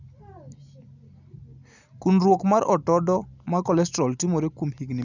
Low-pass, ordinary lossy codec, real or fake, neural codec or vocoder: 7.2 kHz; none; real; none